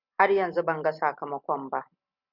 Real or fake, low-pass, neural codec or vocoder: real; 5.4 kHz; none